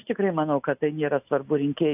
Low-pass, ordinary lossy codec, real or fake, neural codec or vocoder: 3.6 kHz; AAC, 32 kbps; real; none